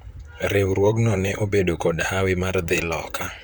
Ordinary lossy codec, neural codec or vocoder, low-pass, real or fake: none; vocoder, 44.1 kHz, 128 mel bands, Pupu-Vocoder; none; fake